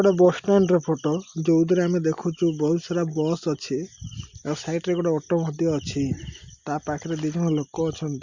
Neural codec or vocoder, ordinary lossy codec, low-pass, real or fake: none; Opus, 64 kbps; 7.2 kHz; real